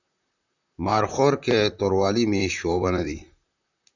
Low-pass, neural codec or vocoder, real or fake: 7.2 kHz; vocoder, 44.1 kHz, 128 mel bands, Pupu-Vocoder; fake